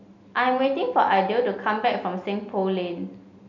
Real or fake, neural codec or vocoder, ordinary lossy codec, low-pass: real; none; none; 7.2 kHz